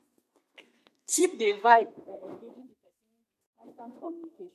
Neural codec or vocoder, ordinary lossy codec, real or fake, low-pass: codec, 32 kHz, 1.9 kbps, SNAC; MP3, 64 kbps; fake; 14.4 kHz